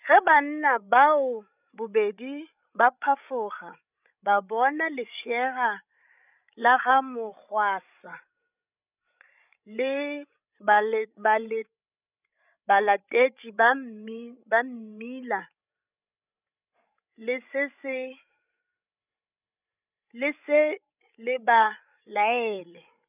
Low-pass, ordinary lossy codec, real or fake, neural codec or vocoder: 3.6 kHz; none; fake; codec, 16 kHz, 16 kbps, FreqCodec, larger model